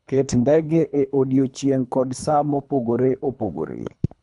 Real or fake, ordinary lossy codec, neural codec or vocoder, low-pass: fake; none; codec, 24 kHz, 3 kbps, HILCodec; 10.8 kHz